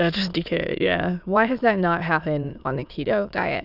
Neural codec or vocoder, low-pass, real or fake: autoencoder, 22.05 kHz, a latent of 192 numbers a frame, VITS, trained on many speakers; 5.4 kHz; fake